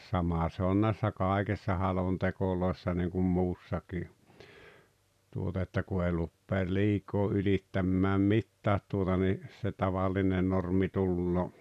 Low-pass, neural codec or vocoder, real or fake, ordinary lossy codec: 14.4 kHz; none; real; none